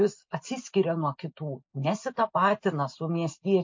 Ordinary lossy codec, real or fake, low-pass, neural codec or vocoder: MP3, 48 kbps; real; 7.2 kHz; none